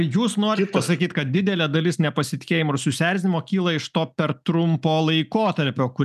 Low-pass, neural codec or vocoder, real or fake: 14.4 kHz; none; real